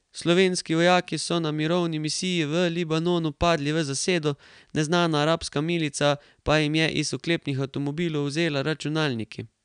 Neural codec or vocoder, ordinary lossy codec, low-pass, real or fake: none; none; 9.9 kHz; real